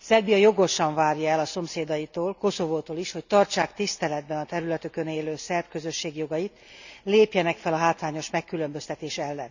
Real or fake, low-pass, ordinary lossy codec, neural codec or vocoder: real; 7.2 kHz; none; none